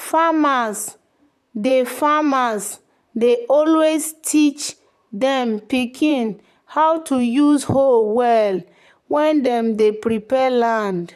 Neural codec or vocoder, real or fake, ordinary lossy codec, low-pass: vocoder, 44.1 kHz, 128 mel bands, Pupu-Vocoder; fake; none; 14.4 kHz